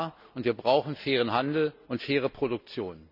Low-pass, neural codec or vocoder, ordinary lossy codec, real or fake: 5.4 kHz; none; none; real